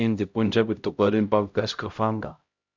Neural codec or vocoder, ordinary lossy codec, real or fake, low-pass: codec, 16 kHz, 0.5 kbps, X-Codec, HuBERT features, trained on LibriSpeech; Opus, 64 kbps; fake; 7.2 kHz